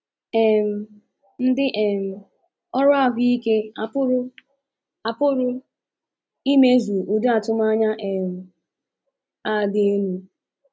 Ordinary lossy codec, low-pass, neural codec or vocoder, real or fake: none; none; none; real